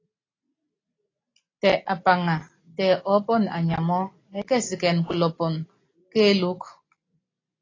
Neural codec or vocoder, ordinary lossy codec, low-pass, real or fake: none; AAC, 32 kbps; 7.2 kHz; real